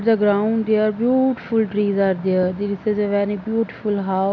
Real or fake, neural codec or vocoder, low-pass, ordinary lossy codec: real; none; 7.2 kHz; none